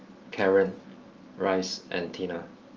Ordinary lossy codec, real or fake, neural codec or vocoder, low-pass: Opus, 32 kbps; real; none; 7.2 kHz